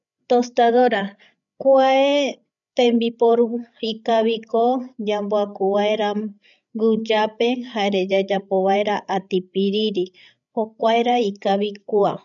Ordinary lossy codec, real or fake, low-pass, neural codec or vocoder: none; fake; 7.2 kHz; codec, 16 kHz, 16 kbps, FreqCodec, larger model